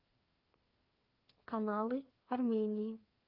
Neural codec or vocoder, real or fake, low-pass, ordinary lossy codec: codec, 16 kHz, 2 kbps, FreqCodec, larger model; fake; 5.4 kHz; Opus, 32 kbps